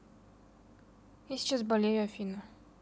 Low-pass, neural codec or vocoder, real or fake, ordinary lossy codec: none; none; real; none